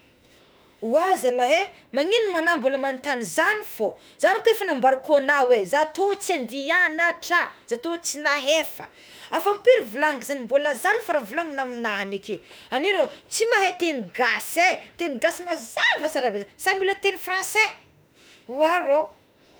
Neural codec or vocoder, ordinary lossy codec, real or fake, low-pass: autoencoder, 48 kHz, 32 numbers a frame, DAC-VAE, trained on Japanese speech; none; fake; none